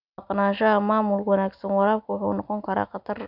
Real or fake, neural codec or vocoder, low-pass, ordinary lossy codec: real; none; 5.4 kHz; none